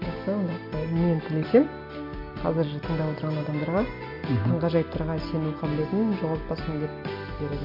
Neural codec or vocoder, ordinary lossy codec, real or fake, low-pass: none; MP3, 32 kbps; real; 5.4 kHz